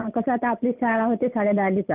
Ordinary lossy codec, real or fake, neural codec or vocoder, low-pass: Opus, 24 kbps; real; none; 3.6 kHz